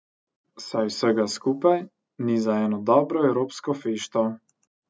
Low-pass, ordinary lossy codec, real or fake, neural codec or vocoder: none; none; real; none